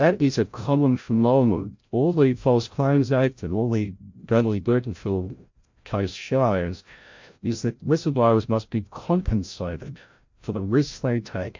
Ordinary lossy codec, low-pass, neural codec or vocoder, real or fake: MP3, 48 kbps; 7.2 kHz; codec, 16 kHz, 0.5 kbps, FreqCodec, larger model; fake